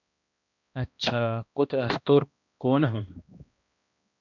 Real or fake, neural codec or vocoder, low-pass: fake; codec, 16 kHz, 1 kbps, X-Codec, HuBERT features, trained on balanced general audio; 7.2 kHz